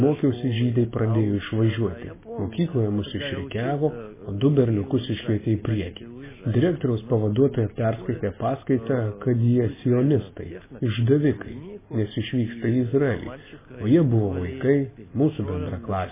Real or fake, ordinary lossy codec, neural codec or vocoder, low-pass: real; MP3, 16 kbps; none; 3.6 kHz